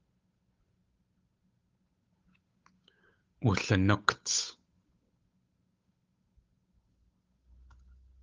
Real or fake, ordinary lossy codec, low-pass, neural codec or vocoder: fake; Opus, 24 kbps; 7.2 kHz; codec, 16 kHz, 8 kbps, FunCodec, trained on Chinese and English, 25 frames a second